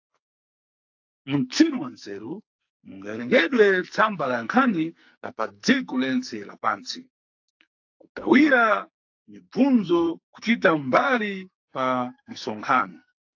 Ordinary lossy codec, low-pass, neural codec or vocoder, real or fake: AAC, 48 kbps; 7.2 kHz; codec, 44.1 kHz, 2.6 kbps, SNAC; fake